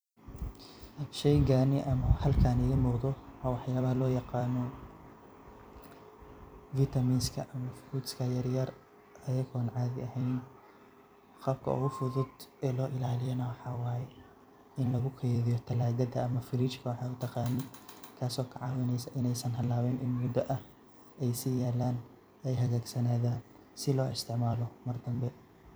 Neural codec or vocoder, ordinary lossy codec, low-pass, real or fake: vocoder, 44.1 kHz, 128 mel bands every 256 samples, BigVGAN v2; none; none; fake